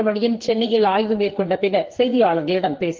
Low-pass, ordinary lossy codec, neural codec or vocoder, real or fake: 7.2 kHz; Opus, 16 kbps; codec, 44.1 kHz, 2.6 kbps, SNAC; fake